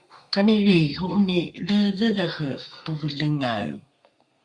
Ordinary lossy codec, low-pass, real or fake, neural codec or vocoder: Opus, 64 kbps; 9.9 kHz; fake; codec, 32 kHz, 1.9 kbps, SNAC